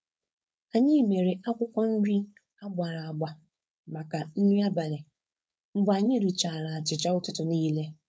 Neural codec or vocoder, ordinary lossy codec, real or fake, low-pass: codec, 16 kHz, 4.8 kbps, FACodec; none; fake; none